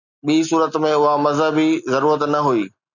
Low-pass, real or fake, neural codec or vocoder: 7.2 kHz; real; none